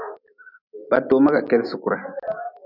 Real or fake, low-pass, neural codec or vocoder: real; 5.4 kHz; none